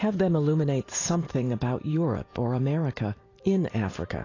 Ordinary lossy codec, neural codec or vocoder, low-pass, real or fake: AAC, 32 kbps; vocoder, 44.1 kHz, 128 mel bands every 512 samples, BigVGAN v2; 7.2 kHz; fake